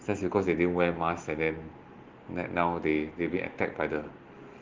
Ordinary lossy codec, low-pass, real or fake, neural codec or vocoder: Opus, 16 kbps; 7.2 kHz; real; none